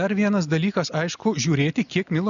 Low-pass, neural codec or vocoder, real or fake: 7.2 kHz; none; real